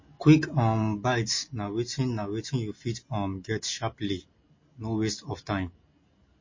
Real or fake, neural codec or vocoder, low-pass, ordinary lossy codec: real; none; 7.2 kHz; MP3, 32 kbps